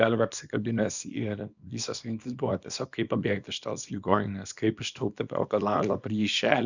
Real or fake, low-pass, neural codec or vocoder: fake; 7.2 kHz; codec, 24 kHz, 0.9 kbps, WavTokenizer, small release